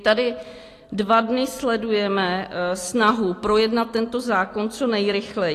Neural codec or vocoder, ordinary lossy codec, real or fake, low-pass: none; AAC, 48 kbps; real; 14.4 kHz